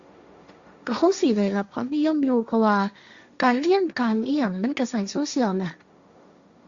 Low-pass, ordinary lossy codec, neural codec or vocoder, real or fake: 7.2 kHz; Opus, 64 kbps; codec, 16 kHz, 1.1 kbps, Voila-Tokenizer; fake